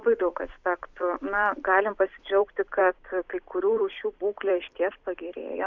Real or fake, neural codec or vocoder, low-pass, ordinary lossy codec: fake; vocoder, 44.1 kHz, 128 mel bands every 256 samples, BigVGAN v2; 7.2 kHz; Opus, 64 kbps